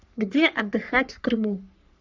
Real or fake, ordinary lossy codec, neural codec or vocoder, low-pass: fake; none; codec, 44.1 kHz, 3.4 kbps, Pupu-Codec; 7.2 kHz